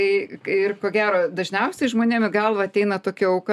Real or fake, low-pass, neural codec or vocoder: real; 14.4 kHz; none